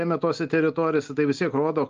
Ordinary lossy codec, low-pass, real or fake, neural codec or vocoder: Opus, 32 kbps; 7.2 kHz; real; none